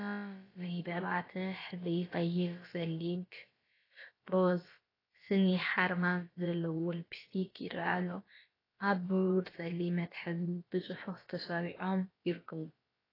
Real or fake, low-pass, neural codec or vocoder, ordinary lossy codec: fake; 5.4 kHz; codec, 16 kHz, about 1 kbps, DyCAST, with the encoder's durations; AAC, 24 kbps